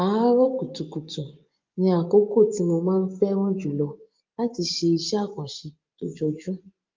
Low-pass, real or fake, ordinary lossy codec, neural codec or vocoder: 7.2 kHz; fake; Opus, 32 kbps; vocoder, 24 kHz, 100 mel bands, Vocos